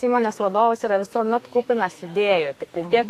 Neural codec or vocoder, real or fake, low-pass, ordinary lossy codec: codec, 32 kHz, 1.9 kbps, SNAC; fake; 14.4 kHz; AAC, 64 kbps